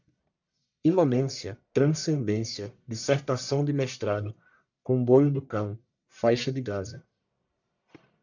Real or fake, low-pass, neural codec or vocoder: fake; 7.2 kHz; codec, 44.1 kHz, 1.7 kbps, Pupu-Codec